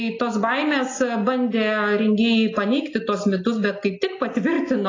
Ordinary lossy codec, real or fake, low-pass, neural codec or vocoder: AAC, 32 kbps; real; 7.2 kHz; none